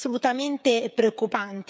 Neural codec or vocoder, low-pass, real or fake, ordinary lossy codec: codec, 16 kHz, 16 kbps, FreqCodec, smaller model; none; fake; none